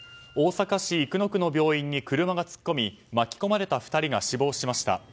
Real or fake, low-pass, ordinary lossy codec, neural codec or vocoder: real; none; none; none